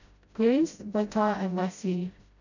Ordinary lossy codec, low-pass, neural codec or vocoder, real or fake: none; 7.2 kHz; codec, 16 kHz, 0.5 kbps, FreqCodec, smaller model; fake